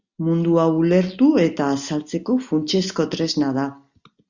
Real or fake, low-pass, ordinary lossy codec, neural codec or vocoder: real; 7.2 kHz; Opus, 64 kbps; none